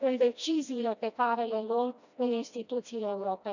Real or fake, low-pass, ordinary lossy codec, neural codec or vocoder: fake; 7.2 kHz; none; codec, 16 kHz, 1 kbps, FreqCodec, smaller model